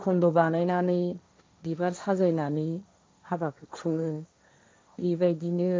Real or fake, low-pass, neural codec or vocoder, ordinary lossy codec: fake; none; codec, 16 kHz, 1.1 kbps, Voila-Tokenizer; none